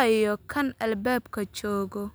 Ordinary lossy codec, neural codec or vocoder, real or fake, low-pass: none; none; real; none